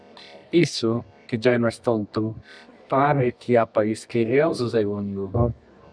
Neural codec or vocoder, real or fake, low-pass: codec, 24 kHz, 0.9 kbps, WavTokenizer, medium music audio release; fake; 9.9 kHz